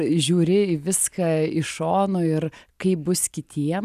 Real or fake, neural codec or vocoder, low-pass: real; none; 14.4 kHz